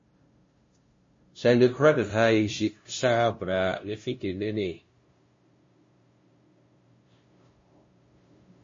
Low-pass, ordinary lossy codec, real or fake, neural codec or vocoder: 7.2 kHz; MP3, 32 kbps; fake; codec, 16 kHz, 0.5 kbps, FunCodec, trained on LibriTTS, 25 frames a second